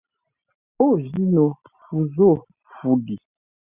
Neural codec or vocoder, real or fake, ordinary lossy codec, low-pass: none; real; Opus, 64 kbps; 3.6 kHz